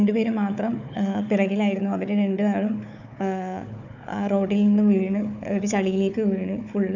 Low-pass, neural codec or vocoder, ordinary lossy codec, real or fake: 7.2 kHz; codec, 16 kHz, 4 kbps, FunCodec, trained on Chinese and English, 50 frames a second; none; fake